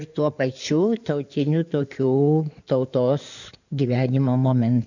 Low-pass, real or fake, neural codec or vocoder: 7.2 kHz; fake; codec, 16 kHz in and 24 kHz out, 2.2 kbps, FireRedTTS-2 codec